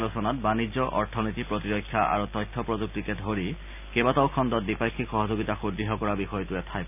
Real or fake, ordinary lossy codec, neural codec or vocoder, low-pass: real; none; none; 3.6 kHz